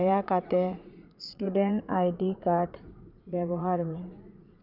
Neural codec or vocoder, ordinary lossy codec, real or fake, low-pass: vocoder, 44.1 kHz, 80 mel bands, Vocos; none; fake; 5.4 kHz